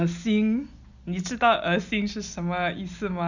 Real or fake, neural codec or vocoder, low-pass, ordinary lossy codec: real; none; 7.2 kHz; none